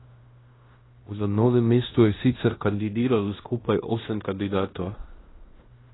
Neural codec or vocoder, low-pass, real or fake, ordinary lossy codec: codec, 16 kHz in and 24 kHz out, 0.9 kbps, LongCat-Audio-Codec, fine tuned four codebook decoder; 7.2 kHz; fake; AAC, 16 kbps